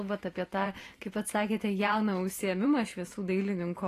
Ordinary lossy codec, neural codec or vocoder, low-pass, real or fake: AAC, 48 kbps; vocoder, 44.1 kHz, 128 mel bands every 512 samples, BigVGAN v2; 14.4 kHz; fake